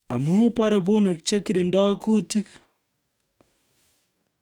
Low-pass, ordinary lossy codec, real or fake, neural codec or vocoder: 19.8 kHz; none; fake; codec, 44.1 kHz, 2.6 kbps, DAC